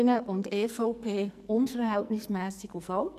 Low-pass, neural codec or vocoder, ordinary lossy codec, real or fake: 14.4 kHz; codec, 44.1 kHz, 2.6 kbps, SNAC; none; fake